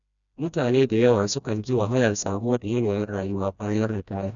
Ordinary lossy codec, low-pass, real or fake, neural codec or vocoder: none; 7.2 kHz; fake; codec, 16 kHz, 1 kbps, FreqCodec, smaller model